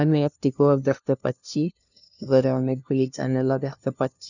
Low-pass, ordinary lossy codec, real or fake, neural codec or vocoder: 7.2 kHz; none; fake; codec, 16 kHz, 0.5 kbps, FunCodec, trained on LibriTTS, 25 frames a second